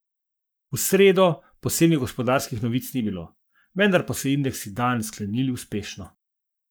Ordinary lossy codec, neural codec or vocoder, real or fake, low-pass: none; codec, 44.1 kHz, 7.8 kbps, Pupu-Codec; fake; none